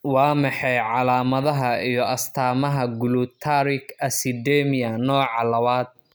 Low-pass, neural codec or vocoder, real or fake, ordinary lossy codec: none; none; real; none